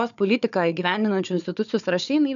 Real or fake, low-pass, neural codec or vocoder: fake; 7.2 kHz; codec, 16 kHz, 4 kbps, FunCodec, trained on Chinese and English, 50 frames a second